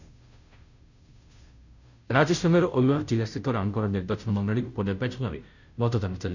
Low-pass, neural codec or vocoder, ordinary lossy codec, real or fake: 7.2 kHz; codec, 16 kHz, 0.5 kbps, FunCodec, trained on Chinese and English, 25 frames a second; none; fake